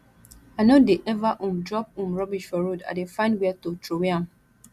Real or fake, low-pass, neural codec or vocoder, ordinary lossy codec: real; 14.4 kHz; none; Opus, 64 kbps